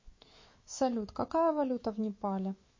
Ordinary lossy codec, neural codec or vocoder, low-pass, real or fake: MP3, 32 kbps; autoencoder, 48 kHz, 128 numbers a frame, DAC-VAE, trained on Japanese speech; 7.2 kHz; fake